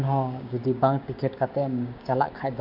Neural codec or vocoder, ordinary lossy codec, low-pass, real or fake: codec, 16 kHz, 6 kbps, DAC; MP3, 48 kbps; 5.4 kHz; fake